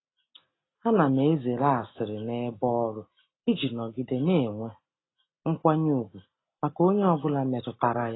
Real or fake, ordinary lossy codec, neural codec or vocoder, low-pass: real; AAC, 16 kbps; none; 7.2 kHz